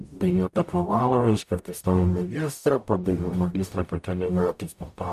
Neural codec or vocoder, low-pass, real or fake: codec, 44.1 kHz, 0.9 kbps, DAC; 14.4 kHz; fake